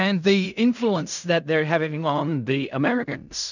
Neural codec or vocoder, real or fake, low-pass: codec, 16 kHz in and 24 kHz out, 0.4 kbps, LongCat-Audio-Codec, fine tuned four codebook decoder; fake; 7.2 kHz